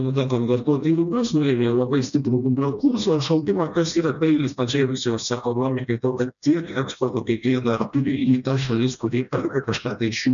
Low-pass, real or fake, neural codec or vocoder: 7.2 kHz; fake; codec, 16 kHz, 1 kbps, FreqCodec, smaller model